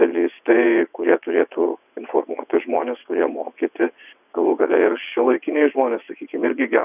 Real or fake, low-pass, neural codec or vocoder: fake; 3.6 kHz; vocoder, 22.05 kHz, 80 mel bands, WaveNeXt